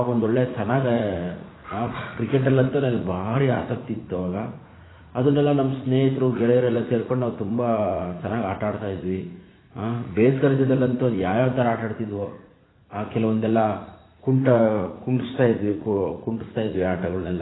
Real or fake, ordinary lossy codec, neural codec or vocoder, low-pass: fake; AAC, 16 kbps; vocoder, 44.1 kHz, 80 mel bands, Vocos; 7.2 kHz